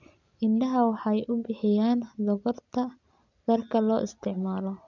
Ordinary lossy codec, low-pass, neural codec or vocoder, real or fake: none; 7.2 kHz; none; real